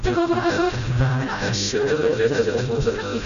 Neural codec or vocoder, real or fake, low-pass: codec, 16 kHz, 0.5 kbps, FreqCodec, smaller model; fake; 7.2 kHz